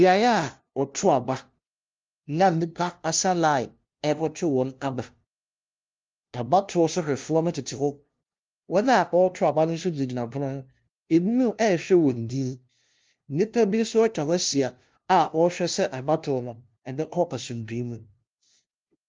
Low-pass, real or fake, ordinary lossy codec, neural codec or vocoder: 7.2 kHz; fake; Opus, 32 kbps; codec, 16 kHz, 0.5 kbps, FunCodec, trained on LibriTTS, 25 frames a second